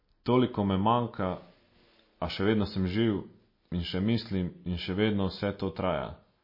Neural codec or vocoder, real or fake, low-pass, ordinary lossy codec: none; real; 5.4 kHz; MP3, 24 kbps